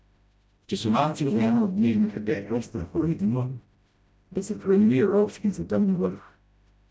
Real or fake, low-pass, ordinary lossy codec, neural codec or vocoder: fake; none; none; codec, 16 kHz, 0.5 kbps, FreqCodec, smaller model